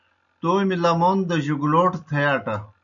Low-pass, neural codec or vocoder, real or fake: 7.2 kHz; none; real